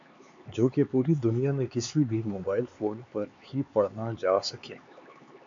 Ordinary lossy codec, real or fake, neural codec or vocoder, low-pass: MP3, 64 kbps; fake; codec, 16 kHz, 4 kbps, X-Codec, HuBERT features, trained on LibriSpeech; 7.2 kHz